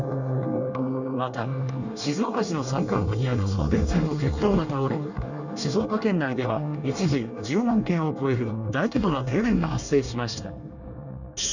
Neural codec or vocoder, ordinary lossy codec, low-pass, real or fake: codec, 24 kHz, 1 kbps, SNAC; none; 7.2 kHz; fake